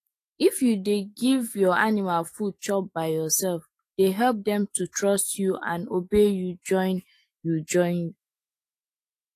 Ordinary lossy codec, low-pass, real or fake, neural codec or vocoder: AAC, 48 kbps; 14.4 kHz; fake; autoencoder, 48 kHz, 128 numbers a frame, DAC-VAE, trained on Japanese speech